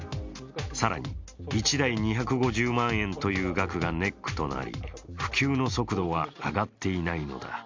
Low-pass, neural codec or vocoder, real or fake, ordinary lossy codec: 7.2 kHz; none; real; MP3, 64 kbps